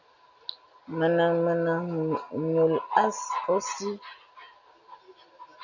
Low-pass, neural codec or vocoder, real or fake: 7.2 kHz; none; real